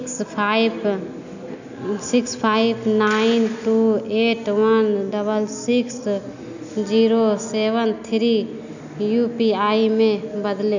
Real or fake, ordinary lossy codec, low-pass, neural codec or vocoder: real; none; 7.2 kHz; none